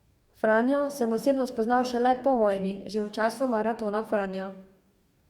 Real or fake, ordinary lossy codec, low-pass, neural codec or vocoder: fake; Opus, 64 kbps; 19.8 kHz; codec, 44.1 kHz, 2.6 kbps, DAC